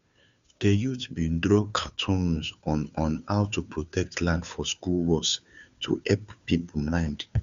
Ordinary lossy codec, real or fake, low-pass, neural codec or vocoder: Opus, 64 kbps; fake; 7.2 kHz; codec, 16 kHz, 2 kbps, FunCodec, trained on Chinese and English, 25 frames a second